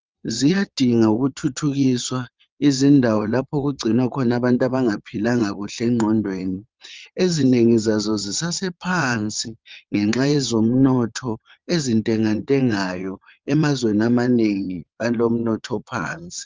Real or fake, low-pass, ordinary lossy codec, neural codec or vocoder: fake; 7.2 kHz; Opus, 24 kbps; vocoder, 22.05 kHz, 80 mel bands, WaveNeXt